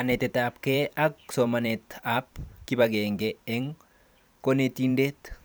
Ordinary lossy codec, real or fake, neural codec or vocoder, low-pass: none; fake; vocoder, 44.1 kHz, 128 mel bands every 512 samples, BigVGAN v2; none